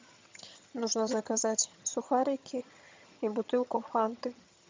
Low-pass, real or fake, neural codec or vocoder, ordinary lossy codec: 7.2 kHz; fake; vocoder, 22.05 kHz, 80 mel bands, HiFi-GAN; MP3, 64 kbps